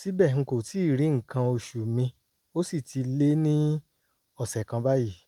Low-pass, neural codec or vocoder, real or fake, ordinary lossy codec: 19.8 kHz; none; real; Opus, 32 kbps